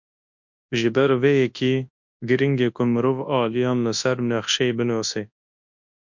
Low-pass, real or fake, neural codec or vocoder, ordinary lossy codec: 7.2 kHz; fake; codec, 24 kHz, 0.9 kbps, WavTokenizer, large speech release; MP3, 48 kbps